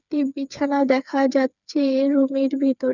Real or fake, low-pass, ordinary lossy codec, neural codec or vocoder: fake; 7.2 kHz; none; codec, 16 kHz, 4 kbps, FreqCodec, smaller model